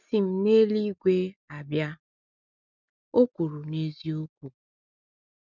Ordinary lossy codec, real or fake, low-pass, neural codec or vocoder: none; real; 7.2 kHz; none